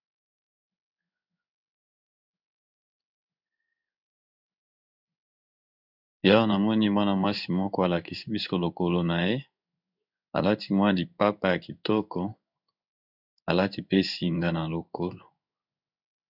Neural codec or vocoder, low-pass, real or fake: codec, 16 kHz in and 24 kHz out, 1 kbps, XY-Tokenizer; 5.4 kHz; fake